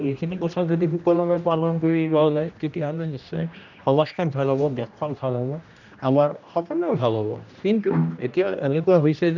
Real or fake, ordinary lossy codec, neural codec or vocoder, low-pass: fake; none; codec, 16 kHz, 1 kbps, X-Codec, HuBERT features, trained on general audio; 7.2 kHz